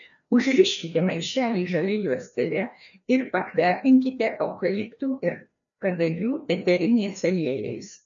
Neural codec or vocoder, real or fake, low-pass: codec, 16 kHz, 1 kbps, FreqCodec, larger model; fake; 7.2 kHz